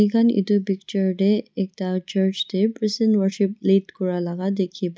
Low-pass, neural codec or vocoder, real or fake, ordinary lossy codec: none; none; real; none